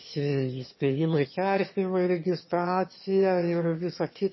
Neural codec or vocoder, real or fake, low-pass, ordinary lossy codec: autoencoder, 22.05 kHz, a latent of 192 numbers a frame, VITS, trained on one speaker; fake; 7.2 kHz; MP3, 24 kbps